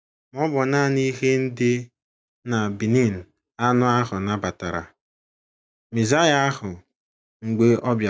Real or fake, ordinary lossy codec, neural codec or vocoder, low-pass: real; none; none; none